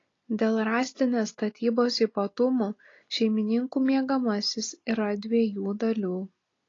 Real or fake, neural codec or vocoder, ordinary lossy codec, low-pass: real; none; AAC, 32 kbps; 7.2 kHz